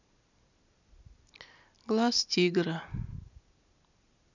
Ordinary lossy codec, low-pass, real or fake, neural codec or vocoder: none; 7.2 kHz; real; none